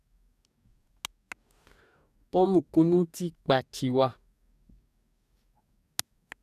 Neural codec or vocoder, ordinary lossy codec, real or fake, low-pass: codec, 44.1 kHz, 2.6 kbps, DAC; none; fake; 14.4 kHz